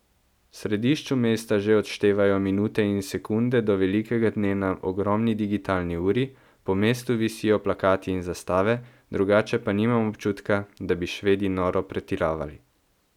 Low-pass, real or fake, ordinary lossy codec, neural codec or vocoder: 19.8 kHz; real; none; none